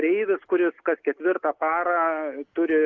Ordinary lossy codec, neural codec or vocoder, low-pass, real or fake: Opus, 32 kbps; none; 7.2 kHz; real